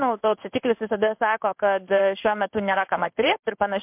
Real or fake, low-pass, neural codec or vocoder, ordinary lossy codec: fake; 3.6 kHz; codec, 16 kHz in and 24 kHz out, 1 kbps, XY-Tokenizer; MP3, 32 kbps